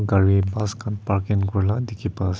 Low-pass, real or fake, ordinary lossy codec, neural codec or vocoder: none; real; none; none